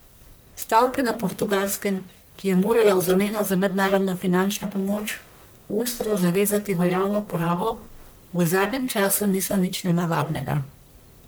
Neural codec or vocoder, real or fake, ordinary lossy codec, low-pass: codec, 44.1 kHz, 1.7 kbps, Pupu-Codec; fake; none; none